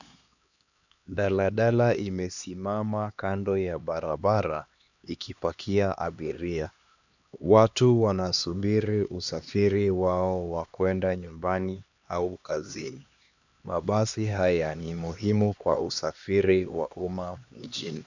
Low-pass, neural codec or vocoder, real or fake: 7.2 kHz; codec, 16 kHz, 2 kbps, X-Codec, HuBERT features, trained on LibriSpeech; fake